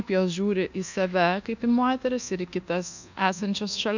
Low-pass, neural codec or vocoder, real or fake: 7.2 kHz; codec, 24 kHz, 1.2 kbps, DualCodec; fake